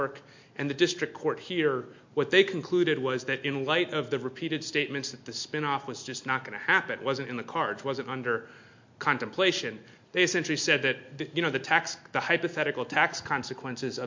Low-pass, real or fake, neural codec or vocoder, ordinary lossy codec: 7.2 kHz; real; none; MP3, 48 kbps